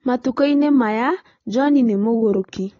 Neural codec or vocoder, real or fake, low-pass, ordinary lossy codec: none; real; 7.2 kHz; AAC, 32 kbps